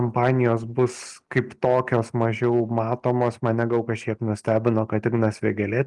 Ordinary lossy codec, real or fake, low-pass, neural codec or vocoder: Opus, 24 kbps; real; 10.8 kHz; none